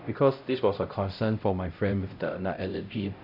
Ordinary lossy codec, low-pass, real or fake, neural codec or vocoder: none; 5.4 kHz; fake; codec, 16 kHz, 0.5 kbps, X-Codec, HuBERT features, trained on LibriSpeech